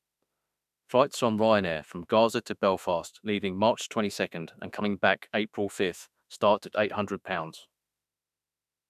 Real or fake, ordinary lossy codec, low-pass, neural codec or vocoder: fake; none; 14.4 kHz; autoencoder, 48 kHz, 32 numbers a frame, DAC-VAE, trained on Japanese speech